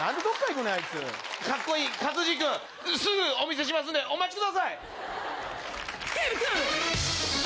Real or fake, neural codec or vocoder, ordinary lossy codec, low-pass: real; none; none; none